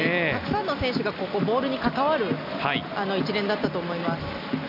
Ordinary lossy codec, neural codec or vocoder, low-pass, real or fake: none; none; 5.4 kHz; real